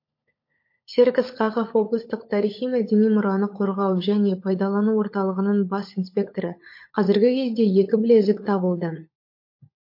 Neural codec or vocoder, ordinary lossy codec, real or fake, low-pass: codec, 16 kHz, 16 kbps, FunCodec, trained on LibriTTS, 50 frames a second; MP3, 32 kbps; fake; 5.4 kHz